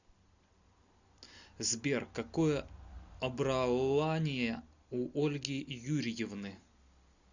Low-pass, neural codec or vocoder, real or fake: 7.2 kHz; none; real